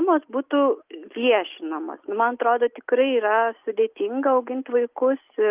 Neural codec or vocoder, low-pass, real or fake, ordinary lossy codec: none; 3.6 kHz; real; Opus, 24 kbps